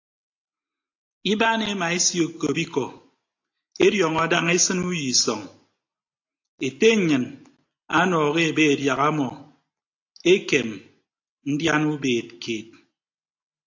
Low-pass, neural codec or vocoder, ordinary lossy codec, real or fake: 7.2 kHz; none; AAC, 48 kbps; real